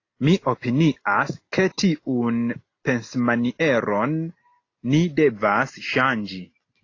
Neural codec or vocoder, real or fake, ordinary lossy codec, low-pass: none; real; AAC, 32 kbps; 7.2 kHz